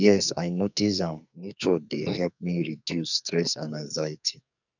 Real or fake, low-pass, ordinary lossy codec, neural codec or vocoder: fake; 7.2 kHz; none; codec, 44.1 kHz, 2.6 kbps, SNAC